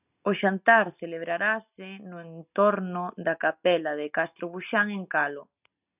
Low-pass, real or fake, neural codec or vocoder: 3.6 kHz; real; none